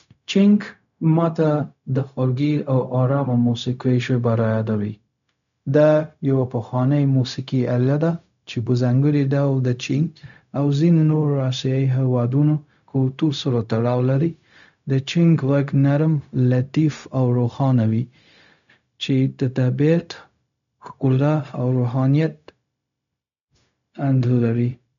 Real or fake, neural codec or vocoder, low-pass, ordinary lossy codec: fake; codec, 16 kHz, 0.4 kbps, LongCat-Audio-Codec; 7.2 kHz; none